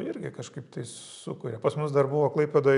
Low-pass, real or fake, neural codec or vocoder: 10.8 kHz; real; none